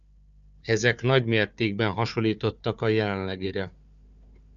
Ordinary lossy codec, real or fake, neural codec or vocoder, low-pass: MP3, 96 kbps; fake; codec, 16 kHz, 4 kbps, FunCodec, trained on Chinese and English, 50 frames a second; 7.2 kHz